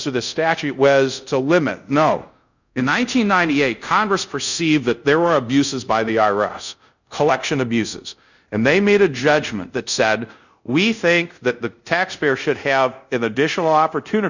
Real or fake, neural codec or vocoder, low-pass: fake; codec, 24 kHz, 0.5 kbps, DualCodec; 7.2 kHz